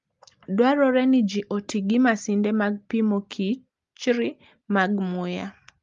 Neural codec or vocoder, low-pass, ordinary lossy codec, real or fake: none; 7.2 kHz; Opus, 32 kbps; real